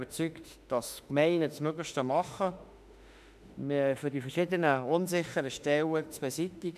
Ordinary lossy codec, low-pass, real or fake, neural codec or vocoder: none; 14.4 kHz; fake; autoencoder, 48 kHz, 32 numbers a frame, DAC-VAE, trained on Japanese speech